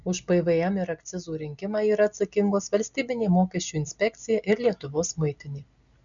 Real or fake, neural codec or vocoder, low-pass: real; none; 7.2 kHz